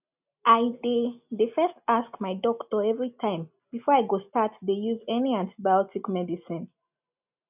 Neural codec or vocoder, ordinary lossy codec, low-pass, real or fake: none; none; 3.6 kHz; real